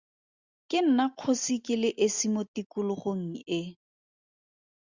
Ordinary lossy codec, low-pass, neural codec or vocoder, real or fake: Opus, 64 kbps; 7.2 kHz; none; real